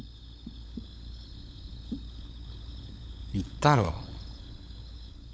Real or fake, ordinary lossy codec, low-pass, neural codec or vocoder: fake; none; none; codec, 16 kHz, 16 kbps, FunCodec, trained on LibriTTS, 50 frames a second